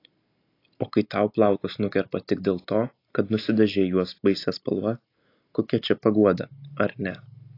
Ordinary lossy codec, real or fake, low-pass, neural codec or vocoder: AAC, 32 kbps; real; 5.4 kHz; none